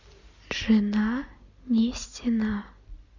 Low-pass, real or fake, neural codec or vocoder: 7.2 kHz; real; none